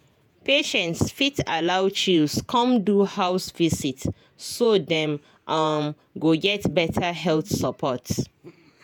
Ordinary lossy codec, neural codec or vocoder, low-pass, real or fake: none; vocoder, 48 kHz, 128 mel bands, Vocos; none; fake